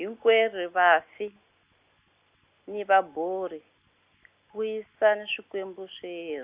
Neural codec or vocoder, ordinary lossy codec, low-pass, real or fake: none; Opus, 64 kbps; 3.6 kHz; real